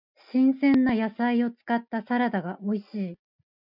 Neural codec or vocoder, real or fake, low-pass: none; real; 5.4 kHz